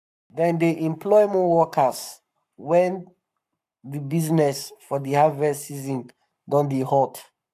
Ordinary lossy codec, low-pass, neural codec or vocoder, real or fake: none; 14.4 kHz; codec, 44.1 kHz, 7.8 kbps, Pupu-Codec; fake